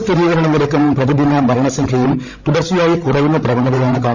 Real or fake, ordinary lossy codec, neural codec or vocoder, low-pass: fake; none; codec, 16 kHz, 16 kbps, FreqCodec, larger model; 7.2 kHz